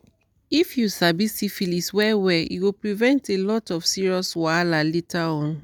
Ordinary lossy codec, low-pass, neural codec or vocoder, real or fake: none; none; none; real